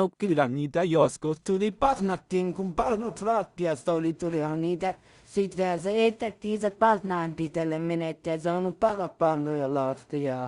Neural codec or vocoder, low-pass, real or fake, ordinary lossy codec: codec, 16 kHz in and 24 kHz out, 0.4 kbps, LongCat-Audio-Codec, two codebook decoder; 10.8 kHz; fake; Opus, 64 kbps